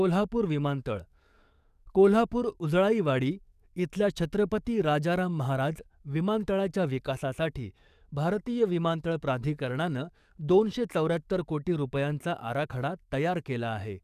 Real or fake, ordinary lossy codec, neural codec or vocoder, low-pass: fake; none; codec, 44.1 kHz, 7.8 kbps, DAC; 14.4 kHz